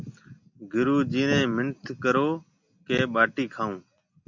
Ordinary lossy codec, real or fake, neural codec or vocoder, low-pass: MP3, 64 kbps; real; none; 7.2 kHz